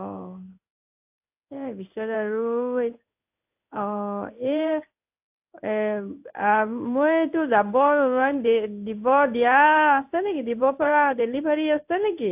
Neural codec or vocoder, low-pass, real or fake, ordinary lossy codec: codec, 16 kHz in and 24 kHz out, 1 kbps, XY-Tokenizer; 3.6 kHz; fake; AAC, 32 kbps